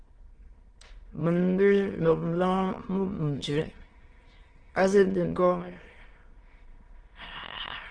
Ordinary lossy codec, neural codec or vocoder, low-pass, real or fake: Opus, 16 kbps; autoencoder, 22.05 kHz, a latent of 192 numbers a frame, VITS, trained on many speakers; 9.9 kHz; fake